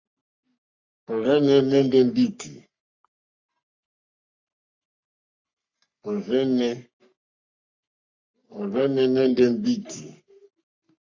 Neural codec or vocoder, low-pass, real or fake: codec, 44.1 kHz, 3.4 kbps, Pupu-Codec; 7.2 kHz; fake